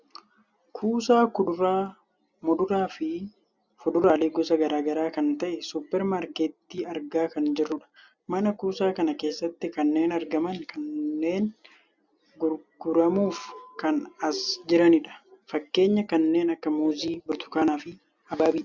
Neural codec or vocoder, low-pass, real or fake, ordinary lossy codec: none; 7.2 kHz; real; Opus, 64 kbps